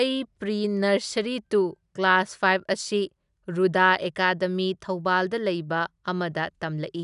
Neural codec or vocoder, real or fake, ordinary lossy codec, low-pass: none; real; none; 10.8 kHz